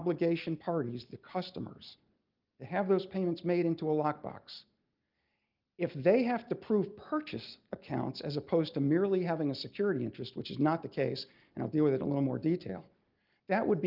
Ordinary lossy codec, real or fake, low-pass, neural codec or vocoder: Opus, 24 kbps; real; 5.4 kHz; none